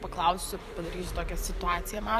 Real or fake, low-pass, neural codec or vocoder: fake; 14.4 kHz; vocoder, 44.1 kHz, 128 mel bands every 512 samples, BigVGAN v2